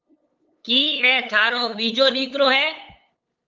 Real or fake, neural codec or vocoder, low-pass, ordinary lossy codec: fake; codec, 16 kHz, 8 kbps, FunCodec, trained on LibriTTS, 25 frames a second; 7.2 kHz; Opus, 24 kbps